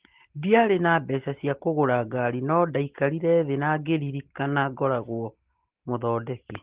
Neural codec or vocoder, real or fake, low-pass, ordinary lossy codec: none; real; 3.6 kHz; Opus, 16 kbps